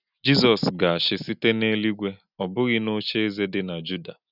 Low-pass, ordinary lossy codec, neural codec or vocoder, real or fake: 5.4 kHz; none; none; real